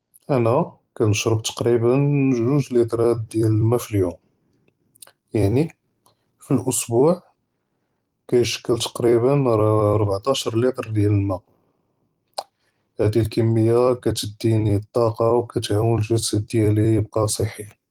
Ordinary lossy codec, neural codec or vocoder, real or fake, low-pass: Opus, 24 kbps; vocoder, 44.1 kHz, 128 mel bands every 256 samples, BigVGAN v2; fake; 19.8 kHz